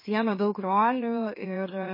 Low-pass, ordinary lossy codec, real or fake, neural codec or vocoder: 5.4 kHz; MP3, 24 kbps; fake; autoencoder, 44.1 kHz, a latent of 192 numbers a frame, MeloTTS